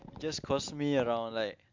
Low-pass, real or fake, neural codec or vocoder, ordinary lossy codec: 7.2 kHz; real; none; MP3, 64 kbps